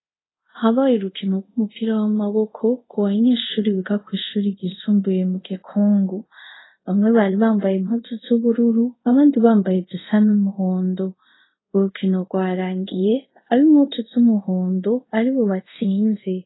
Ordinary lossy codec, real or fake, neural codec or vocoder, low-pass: AAC, 16 kbps; fake; codec, 24 kHz, 0.5 kbps, DualCodec; 7.2 kHz